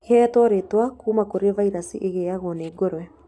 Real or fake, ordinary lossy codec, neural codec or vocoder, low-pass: real; none; none; none